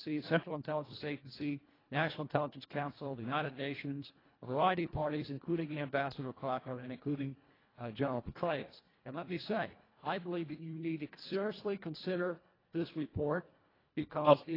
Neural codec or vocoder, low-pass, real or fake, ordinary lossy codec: codec, 24 kHz, 1.5 kbps, HILCodec; 5.4 kHz; fake; AAC, 24 kbps